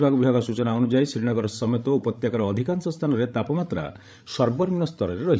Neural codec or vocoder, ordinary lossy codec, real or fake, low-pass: codec, 16 kHz, 16 kbps, FreqCodec, larger model; none; fake; none